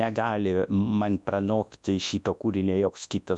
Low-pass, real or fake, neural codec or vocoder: 10.8 kHz; fake; codec, 24 kHz, 0.9 kbps, WavTokenizer, large speech release